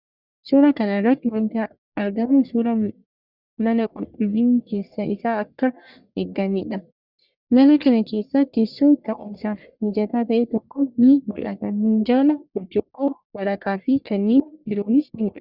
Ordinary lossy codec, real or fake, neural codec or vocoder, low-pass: Opus, 64 kbps; fake; codec, 44.1 kHz, 1.7 kbps, Pupu-Codec; 5.4 kHz